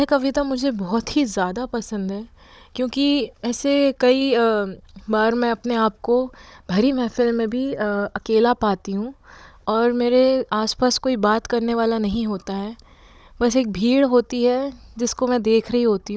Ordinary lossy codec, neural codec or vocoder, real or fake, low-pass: none; codec, 16 kHz, 16 kbps, FunCodec, trained on Chinese and English, 50 frames a second; fake; none